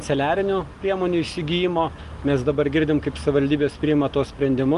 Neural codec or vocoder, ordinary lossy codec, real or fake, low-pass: none; Opus, 24 kbps; real; 10.8 kHz